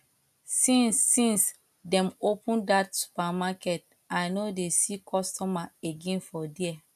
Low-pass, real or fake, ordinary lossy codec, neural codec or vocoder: 14.4 kHz; real; none; none